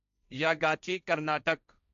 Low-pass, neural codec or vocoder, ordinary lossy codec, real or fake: 7.2 kHz; codec, 16 kHz, 1.1 kbps, Voila-Tokenizer; none; fake